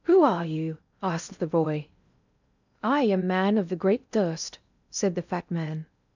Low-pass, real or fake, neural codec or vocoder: 7.2 kHz; fake; codec, 16 kHz in and 24 kHz out, 0.6 kbps, FocalCodec, streaming, 4096 codes